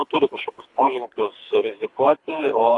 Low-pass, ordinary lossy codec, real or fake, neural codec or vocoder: 10.8 kHz; AAC, 48 kbps; fake; codec, 24 kHz, 3 kbps, HILCodec